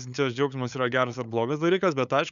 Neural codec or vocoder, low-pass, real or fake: codec, 16 kHz, 8 kbps, FunCodec, trained on LibriTTS, 25 frames a second; 7.2 kHz; fake